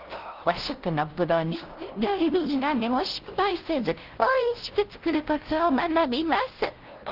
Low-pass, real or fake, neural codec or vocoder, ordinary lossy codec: 5.4 kHz; fake; codec, 16 kHz, 0.5 kbps, FunCodec, trained on LibriTTS, 25 frames a second; Opus, 16 kbps